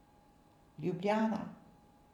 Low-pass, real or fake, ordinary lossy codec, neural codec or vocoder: 19.8 kHz; fake; none; vocoder, 44.1 kHz, 128 mel bands every 512 samples, BigVGAN v2